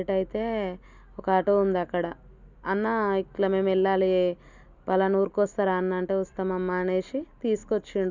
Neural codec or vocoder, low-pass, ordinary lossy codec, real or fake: none; 7.2 kHz; none; real